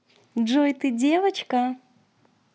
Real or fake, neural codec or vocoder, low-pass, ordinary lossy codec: real; none; none; none